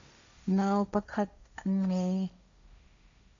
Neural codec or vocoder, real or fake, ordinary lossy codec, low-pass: codec, 16 kHz, 1.1 kbps, Voila-Tokenizer; fake; MP3, 64 kbps; 7.2 kHz